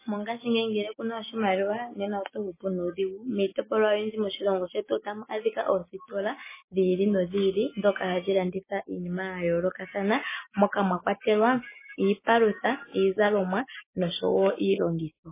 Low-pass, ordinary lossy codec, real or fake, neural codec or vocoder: 3.6 kHz; MP3, 16 kbps; real; none